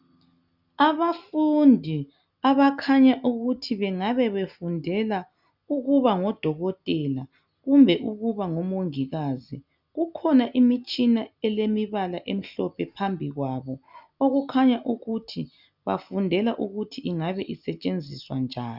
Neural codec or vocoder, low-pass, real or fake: none; 5.4 kHz; real